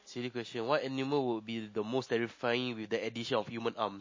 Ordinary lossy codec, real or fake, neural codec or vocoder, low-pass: MP3, 32 kbps; real; none; 7.2 kHz